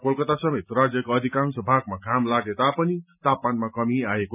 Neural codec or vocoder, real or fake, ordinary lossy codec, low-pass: none; real; none; 3.6 kHz